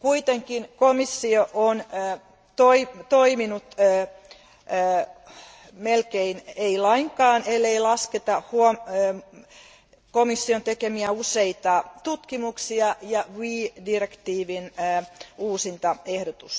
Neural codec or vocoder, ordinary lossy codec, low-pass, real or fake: none; none; none; real